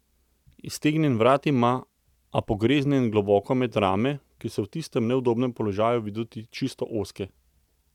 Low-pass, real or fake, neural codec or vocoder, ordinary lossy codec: 19.8 kHz; real; none; none